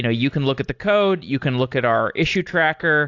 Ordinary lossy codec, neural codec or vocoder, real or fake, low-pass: AAC, 48 kbps; none; real; 7.2 kHz